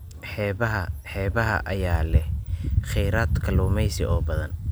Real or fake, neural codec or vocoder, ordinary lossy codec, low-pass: real; none; none; none